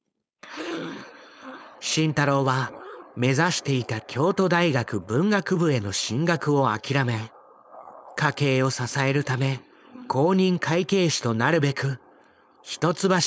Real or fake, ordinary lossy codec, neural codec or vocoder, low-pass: fake; none; codec, 16 kHz, 4.8 kbps, FACodec; none